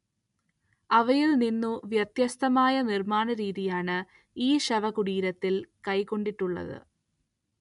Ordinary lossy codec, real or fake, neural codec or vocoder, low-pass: none; real; none; 10.8 kHz